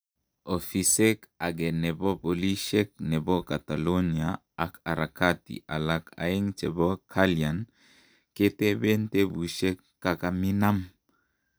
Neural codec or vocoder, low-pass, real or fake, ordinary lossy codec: none; none; real; none